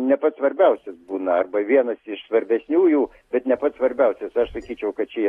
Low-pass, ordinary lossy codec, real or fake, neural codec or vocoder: 19.8 kHz; AAC, 32 kbps; real; none